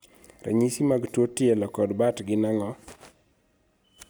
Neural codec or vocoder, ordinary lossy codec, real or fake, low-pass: none; none; real; none